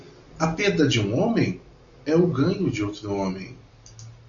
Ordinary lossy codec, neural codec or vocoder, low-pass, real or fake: MP3, 64 kbps; none; 7.2 kHz; real